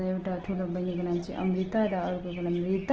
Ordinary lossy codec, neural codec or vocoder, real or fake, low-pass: Opus, 16 kbps; none; real; 7.2 kHz